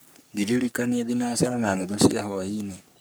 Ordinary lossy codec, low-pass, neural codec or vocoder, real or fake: none; none; codec, 44.1 kHz, 3.4 kbps, Pupu-Codec; fake